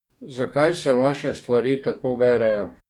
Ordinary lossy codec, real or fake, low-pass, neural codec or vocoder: none; fake; 19.8 kHz; codec, 44.1 kHz, 2.6 kbps, DAC